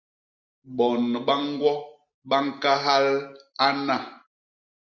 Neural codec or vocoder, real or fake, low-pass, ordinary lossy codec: none; real; 7.2 kHz; Opus, 64 kbps